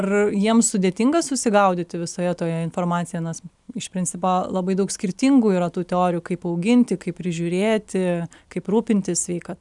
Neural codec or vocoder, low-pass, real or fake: none; 10.8 kHz; real